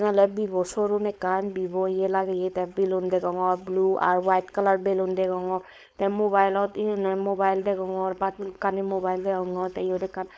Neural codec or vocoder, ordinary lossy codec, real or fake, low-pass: codec, 16 kHz, 4.8 kbps, FACodec; none; fake; none